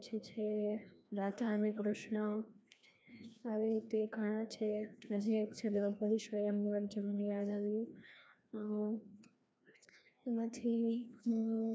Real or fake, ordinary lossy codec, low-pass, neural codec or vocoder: fake; none; none; codec, 16 kHz, 1 kbps, FreqCodec, larger model